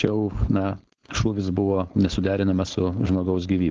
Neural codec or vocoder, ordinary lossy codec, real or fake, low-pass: codec, 16 kHz, 4.8 kbps, FACodec; Opus, 16 kbps; fake; 7.2 kHz